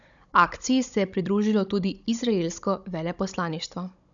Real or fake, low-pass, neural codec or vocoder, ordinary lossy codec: fake; 7.2 kHz; codec, 16 kHz, 16 kbps, FunCodec, trained on Chinese and English, 50 frames a second; none